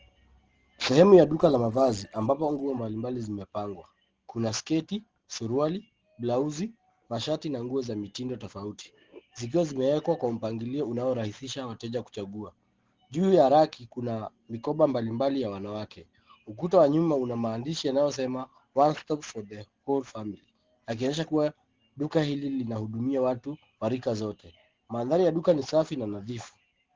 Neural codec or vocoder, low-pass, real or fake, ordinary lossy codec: none; 7.2 kHz; real; Opus, 16 kbps